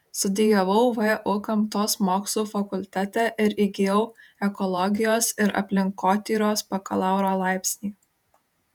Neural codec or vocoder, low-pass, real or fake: vocoder, 48 kHz, 128 mel bands, Vocos; 19.8 kHz; fake